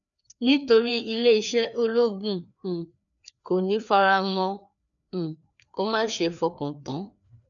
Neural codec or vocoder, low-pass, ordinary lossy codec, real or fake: codec, 16 kHz, 2 kbps, FreqCodec, larger model; 7.2 kHz; none; fake